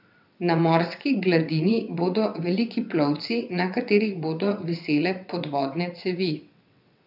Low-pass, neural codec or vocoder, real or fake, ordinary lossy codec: 5.4 kHz; vocoder, 22.05 kHz, 80 mel bands, WaveNeXt; fake; none